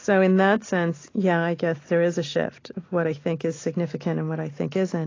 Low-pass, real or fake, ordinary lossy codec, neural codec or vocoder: 7.2 kHz; real; AAC, 32 kbps; none